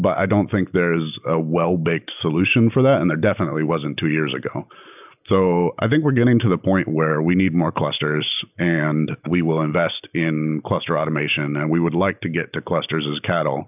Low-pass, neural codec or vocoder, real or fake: 3.6 kHz; none; real